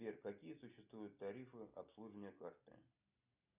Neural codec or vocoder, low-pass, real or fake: none; 3.6 kHz; real